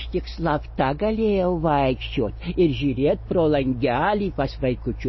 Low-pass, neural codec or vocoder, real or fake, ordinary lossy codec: 7.2 kHz; none; real; MP3, 24 kbps